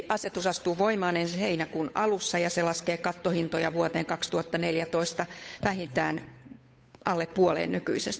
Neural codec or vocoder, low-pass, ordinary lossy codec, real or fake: codec, 16 kHz, 8 kbps, FunCodec, trained on Chinese and English, 25 frames a second; none; none; fake